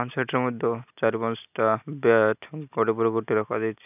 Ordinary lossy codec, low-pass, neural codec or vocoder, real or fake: none; 3.6 kHz; none; real